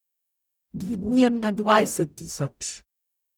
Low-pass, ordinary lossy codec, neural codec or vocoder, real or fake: none; none; codec, 44.1 kHz, 0.9 kbps, DAC; fake